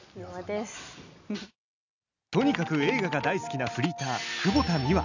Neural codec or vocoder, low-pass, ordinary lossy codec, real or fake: none; 7.2 kHz; none; real